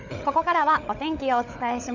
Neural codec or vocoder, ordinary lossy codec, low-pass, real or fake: codec, 16 kHz, 16 kbps, FunCodec, trained on LibriTTS, 50 frames a second; none; 7.2 kHz; fake